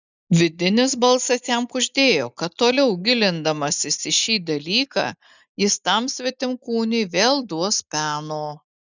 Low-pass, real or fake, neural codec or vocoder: 7.2 kHz; real; none